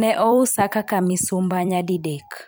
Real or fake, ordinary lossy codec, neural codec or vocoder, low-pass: fake; none; vocoder, 44.1 kHz, 128 mel bands every 256 samples, BigVGAN v2; none